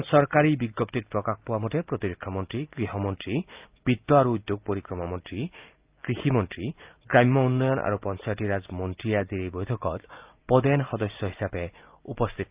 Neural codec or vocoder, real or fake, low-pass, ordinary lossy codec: none; real; 3.6 kHz; Opus, 24 kbps